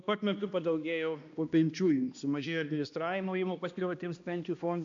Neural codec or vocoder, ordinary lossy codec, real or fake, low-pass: codec, 16 kHz, 1 kbps, X-Codec, HuBERT features, trained on balanced general audio; MP3, 64 kbps; fake; 7.2 kHz